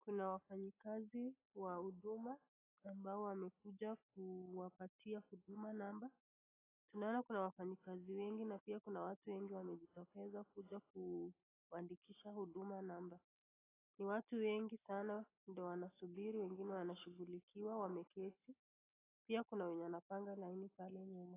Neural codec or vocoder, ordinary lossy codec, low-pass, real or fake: none; AAC, 16 kbps; 3.6 kHz; real